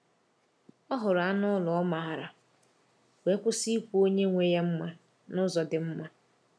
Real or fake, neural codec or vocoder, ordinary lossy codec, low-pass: real; none; none; none